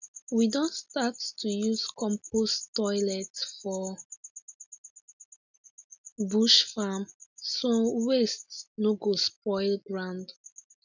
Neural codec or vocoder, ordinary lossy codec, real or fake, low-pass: none; none; real; 7.2 kHz